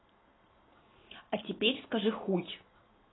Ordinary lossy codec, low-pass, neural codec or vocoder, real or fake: AAC, 16 kbps; 7.2 kHz; none; real